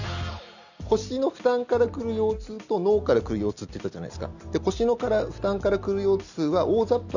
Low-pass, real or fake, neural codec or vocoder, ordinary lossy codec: 7.2 kHz; real; none; none